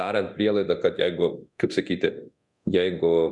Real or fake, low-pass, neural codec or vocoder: fake; 10.8 kHz; codec, 24 kHz, 0.9 kbps, DualCodec